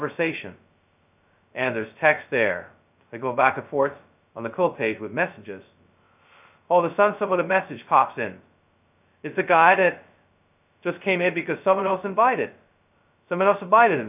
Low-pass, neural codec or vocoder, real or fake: 3.6 kHz; codec, 16 kHz, 0.2 kbps, FocalCodec; fake